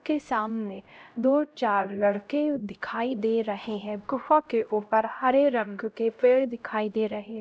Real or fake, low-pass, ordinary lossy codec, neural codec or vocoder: fake; none; none; codec, 16 kHz, 0.5 kbps, X-Codec, HuBERT features, trained on LibriSpeech